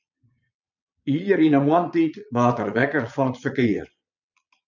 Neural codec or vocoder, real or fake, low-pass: vocoder, 22.05 kHz, 80 mel bands, Vocos; fake; 7.2 kHz